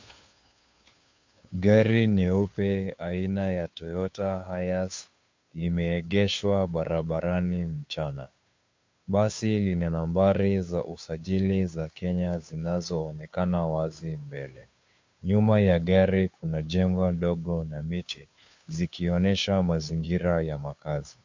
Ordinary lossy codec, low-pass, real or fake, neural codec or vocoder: MP3, 48 kbps; 7.2 kHz; fake; codec, 16 kHz, 2 kbps, FunCodec, trained on Chinese and English, 25 frames a second